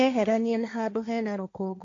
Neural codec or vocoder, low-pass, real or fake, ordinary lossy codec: codec, 16 kHz, 1.1 kbps, Voila-Tokenizer; 7.2 kHz; fake; AAC, 48 kbps